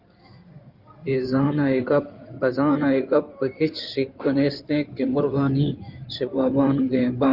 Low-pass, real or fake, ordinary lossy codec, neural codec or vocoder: 5.4 kHz; fake; Opus, 32 kbps; codec, 16 kHz in and 24 kHz out, 2.2 kbps, FireRedTTS-2 codec